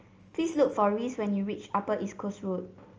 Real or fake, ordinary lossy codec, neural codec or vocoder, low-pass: real; Opus, 24 kbps; none; 7.2 kHz